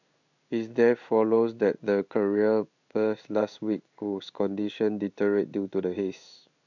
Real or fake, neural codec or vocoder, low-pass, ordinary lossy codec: fake; codec, 16 kHz in and 24 kHz out, 1 kbps, XY-Tokenizer; 7.2 kHz; none